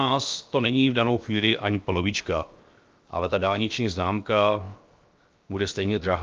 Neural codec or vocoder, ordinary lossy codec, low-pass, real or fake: codec, 16 kHz, 0.7 kbps, FocalCodec; Opus, 24 kbps; 7.2 kHz; fake